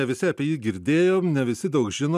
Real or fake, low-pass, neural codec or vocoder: real; 14.4 kHz; none